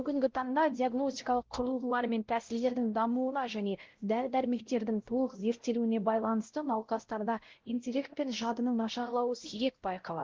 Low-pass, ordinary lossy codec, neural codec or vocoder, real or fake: 7.2 kHz; Opus, 16 kbps; codec, 16 kHz, 0.5 kbps, X-Codec, WavLM features, trained on Multilingual LibriSpeech; fake